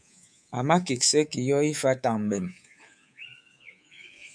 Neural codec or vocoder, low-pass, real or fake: codec, 24 kHz, 3.1 kbps, DualCodec; 9.9 kHz; fake